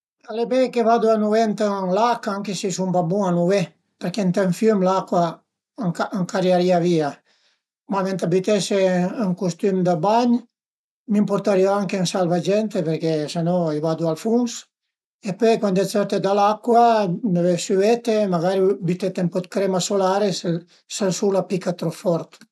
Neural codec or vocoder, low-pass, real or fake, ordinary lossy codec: none; none; real; none